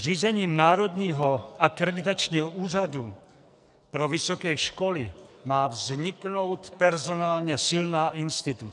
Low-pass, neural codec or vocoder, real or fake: 10.8 kHz; codec, 44.1 kHz, 2.6 kbps, SNAC; fake